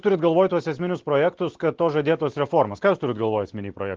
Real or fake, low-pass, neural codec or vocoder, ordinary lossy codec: real; 7.2 kHz; none; Opus, 16 kbps